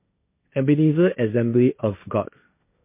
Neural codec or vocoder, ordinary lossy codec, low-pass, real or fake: codec, 16 kHz, 1.1 kbps, Voila-Tokenizer; MP3, 24 kbps; 3.6 kHz; fake